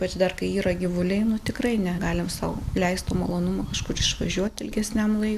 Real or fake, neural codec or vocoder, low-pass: real; none; 14.4 kHz